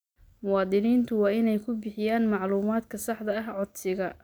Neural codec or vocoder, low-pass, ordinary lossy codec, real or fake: none; none; none; real